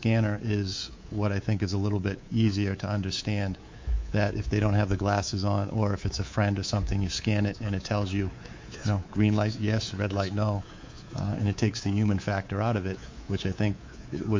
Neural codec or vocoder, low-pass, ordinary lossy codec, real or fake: codec, 24 kHz, 3.1 kbps, DualCodec; 7.2 kHz; MP3, 48 kbps; fake